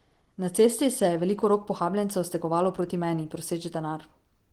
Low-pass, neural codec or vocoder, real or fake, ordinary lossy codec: 19.8 kHz; none; real; Opus, 16 kbps